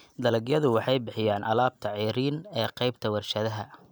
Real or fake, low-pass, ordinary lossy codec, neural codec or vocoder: real; none; none; none